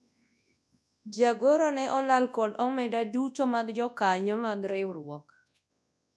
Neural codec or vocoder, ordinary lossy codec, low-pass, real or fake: codec, 24 kHz, 0.9 kbps, WavTokenizer, large speech release; none; none; fake